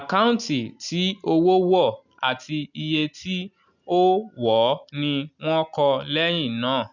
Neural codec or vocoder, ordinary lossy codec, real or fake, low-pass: none; none; real; 7.2 kHz